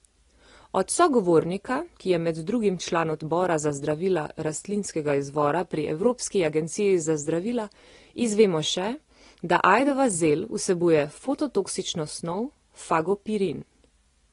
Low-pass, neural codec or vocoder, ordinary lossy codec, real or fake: 10.8 kHz; none; AAC, 32 kbps; real